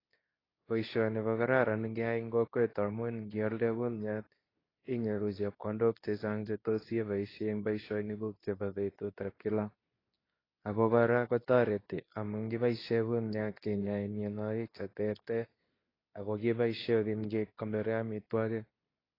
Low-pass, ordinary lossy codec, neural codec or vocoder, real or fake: 5.4 kHz; AAC, 24 kbps; codec, 24 kHz, 0.9 kbps, WavTokenizer, medium speech release version 2; fake